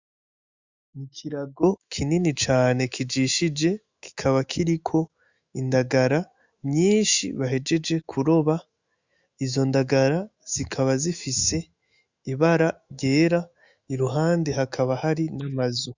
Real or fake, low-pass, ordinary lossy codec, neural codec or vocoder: fake; 7.2 kHz; Opus, 64 kbps; autoencoder, 48 kHz, 128 numbers a frame, DAC-VAE, trained on Japanese speech